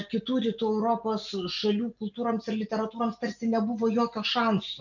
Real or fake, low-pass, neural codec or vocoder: real; 7.2 kHz; none